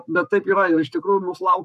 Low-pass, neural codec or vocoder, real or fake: 14.4 kHz; codec, 44.1 kHz, 7.8 kbps, Pupu-Codec; fake